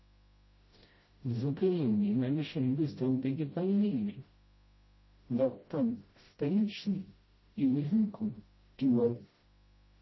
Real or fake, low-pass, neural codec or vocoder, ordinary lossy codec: fake; 7.2 kHz; codec, 16 kHz, 0.5 kbps, FreqCodec, smaller model; MP3, 24 kbps